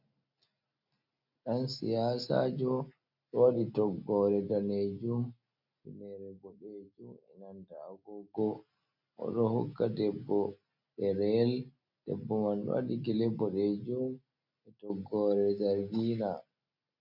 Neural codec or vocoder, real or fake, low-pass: none; real; 5.4 kHz